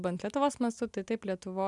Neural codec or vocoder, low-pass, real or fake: none; 10.8 kHz; real